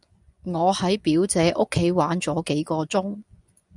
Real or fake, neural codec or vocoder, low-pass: fake; vocoder, 24 kHz, 100 mel bands, Vocos; 10.8 kHz